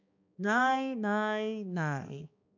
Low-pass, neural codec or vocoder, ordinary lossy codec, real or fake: 7.2 kHz; codec, 16 kHz, 2 kbps, X-Codec, HuBERT features, trained on balanced general audio; none; fake